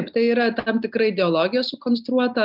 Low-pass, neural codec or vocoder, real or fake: 5.4 kHz; none; real